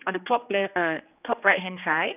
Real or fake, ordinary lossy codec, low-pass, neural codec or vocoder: fake; none; 3.6 kHz; codec, 16 kHz, 2 kbps, X-Codec, HuBERT features, trained on general audio